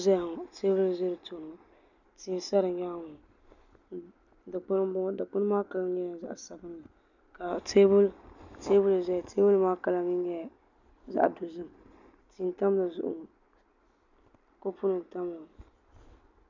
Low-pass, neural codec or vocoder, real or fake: 7.2 kHz; none; real